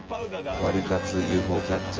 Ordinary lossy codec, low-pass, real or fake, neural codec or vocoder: Opus, 24 kbps; 7.2 kHz; fake; vocoder, 24 kHz, 100 mel bands, Vocos